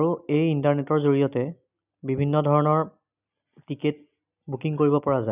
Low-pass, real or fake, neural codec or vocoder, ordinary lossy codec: 3.6 kHz; real; none; none